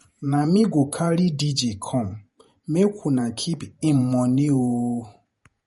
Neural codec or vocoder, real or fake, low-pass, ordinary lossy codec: vocoder, 48 kHz, 128 mel bands, Vocos; fake; 19.8 kHz; MP3, 48 kbps